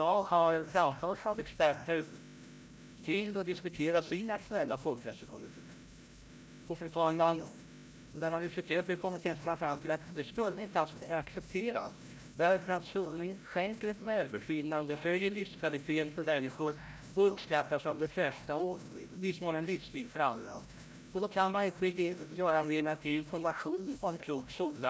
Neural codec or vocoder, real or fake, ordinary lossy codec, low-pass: codec, 16 kHz, 0.5 kbps, FreqCodec, larger model; fake; none; none